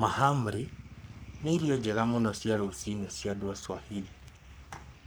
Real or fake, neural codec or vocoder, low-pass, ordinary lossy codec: fake; codec, 44.1 kHz, 3.4 kbps, Pupu-Codec; none; none